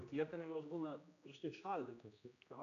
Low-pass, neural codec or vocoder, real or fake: 7.2 kHz; codec, 16 kHz, 1 kbps, X-Codec, HuBERT features, trained on general audio; fake